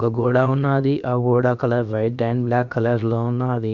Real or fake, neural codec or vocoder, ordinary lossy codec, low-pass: fake; codec, 16 kHz, about 1 kbps, DyCAST, with the encoder's durations; none; 7.2 kHz